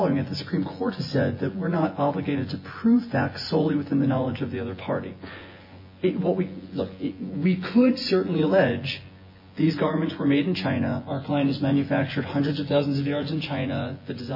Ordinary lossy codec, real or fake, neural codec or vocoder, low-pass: MP3, 24 kbps; fake; vocoder, 24 kHz, 100 mel bands, Vocos; 5.4 kHz